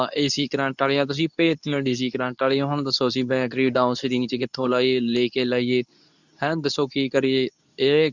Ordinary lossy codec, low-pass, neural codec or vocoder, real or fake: none; 7.2 kHz; codec, 24 kHz, 0.9 kbps, WavTokenizer, medium speech release version 2; fake